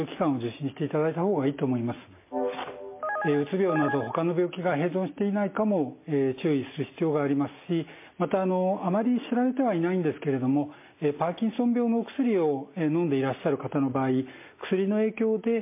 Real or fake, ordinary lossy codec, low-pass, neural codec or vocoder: real; MP3, 24 kbps; 3.6 kHz; none